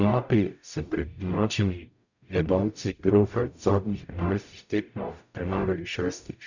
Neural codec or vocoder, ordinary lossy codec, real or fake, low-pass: codec, 44.1 kHz, 0.9 kbps, DAC; none; fake; 7.2 kHz